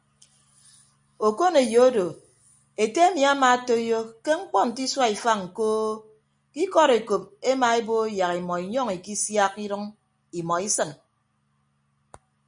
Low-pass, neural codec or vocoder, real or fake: 9.9 kHz; none; real